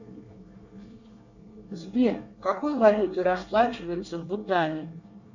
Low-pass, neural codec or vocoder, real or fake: 7.2 kHz; codec, 24 kHz, 1 kbps, SNAC; fake